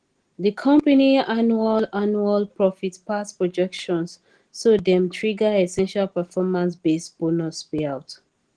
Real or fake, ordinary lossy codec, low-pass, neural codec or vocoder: real; Opus, 16 kbps; 9.9 kHz; none